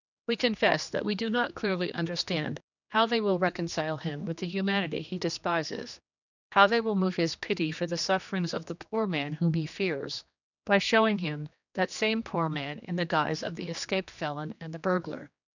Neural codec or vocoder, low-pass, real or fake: codec, 16 kHz, 2 kbps, X-Codec, HuBERT features, trained on general audio; 7.2 kHz; fake